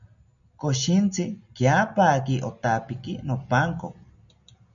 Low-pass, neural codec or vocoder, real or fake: 7.2 kHz; none; real